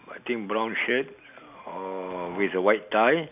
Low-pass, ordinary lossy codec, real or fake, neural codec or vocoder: 3.6 kHz; none; real; none